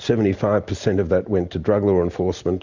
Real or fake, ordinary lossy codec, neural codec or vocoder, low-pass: real; Opus, 64 kbps; none; 7.2 kHz